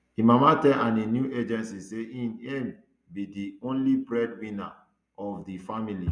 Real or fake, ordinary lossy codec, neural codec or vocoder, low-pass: real; none; none; 9.9 kHz